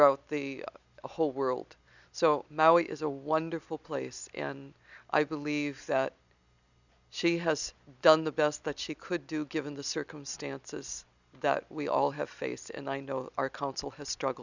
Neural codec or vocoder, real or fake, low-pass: none; real; 7.2 kHz